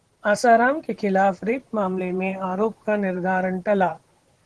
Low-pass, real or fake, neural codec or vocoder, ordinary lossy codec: 10.8 kHz; fake; vocoder, 44.1 kHz, 128 mel bands, Pupu-Vocoder; Opus, 16 kbps